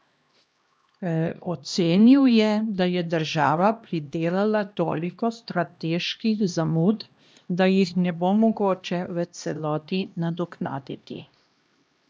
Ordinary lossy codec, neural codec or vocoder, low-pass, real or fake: none; codec, 16 kHz, 1 kbps, X-Codec, HuBERT features, trained on LibriSpeech; none; fake